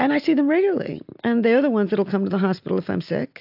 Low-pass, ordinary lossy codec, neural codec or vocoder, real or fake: 5.4 kHz; AAC, 48 kbps; none; real